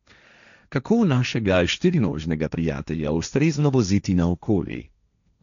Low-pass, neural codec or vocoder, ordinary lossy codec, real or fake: 7.2 kHz; codec, 16 kHz, 1.1 kbps, Voila-Tokenizer; MP3, 96 kbps; fake